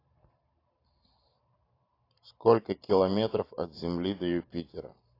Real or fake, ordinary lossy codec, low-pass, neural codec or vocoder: fake; AAC, 32 kbps; 5.4 kHz; vocoder, 44.1 kHz, 128 mel bands every 512 samples, BigVGAN v2